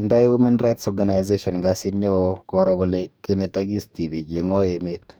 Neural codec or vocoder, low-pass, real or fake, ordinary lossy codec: codec, 44.1 kHz, 2.6 kbps, DAC; none; fake; none